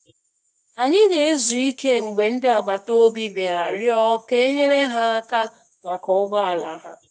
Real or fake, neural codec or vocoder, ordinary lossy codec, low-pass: fake; codec, 24 kHz, 0.9 kbps, WavTokenizer, medium music audio release; none; none